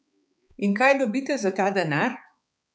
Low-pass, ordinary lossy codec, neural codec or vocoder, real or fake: none; none; codec, 16 kHz, 4 kbps, X-Codec, HuBERT features, trained on balanced general audio; fake